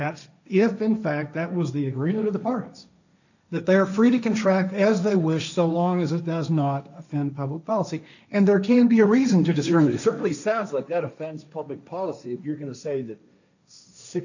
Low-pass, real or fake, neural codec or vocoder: 7.2 kHz; fake; codec, 16 kHz, 1.1 kbps, Voila-Tokenizer